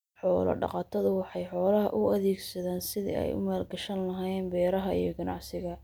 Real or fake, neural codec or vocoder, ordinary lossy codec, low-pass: real; none; none; none